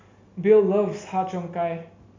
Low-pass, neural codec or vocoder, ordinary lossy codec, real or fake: 7.2 kHz; none; MP3, 48 kbps; real